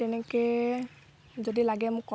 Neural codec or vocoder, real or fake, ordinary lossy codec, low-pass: none; real; none; none